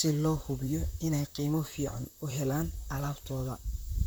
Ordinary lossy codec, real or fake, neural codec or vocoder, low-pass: none; fake; vocoder, 44.1 kHz, 128 mel bands, Pupu-Vocoder; none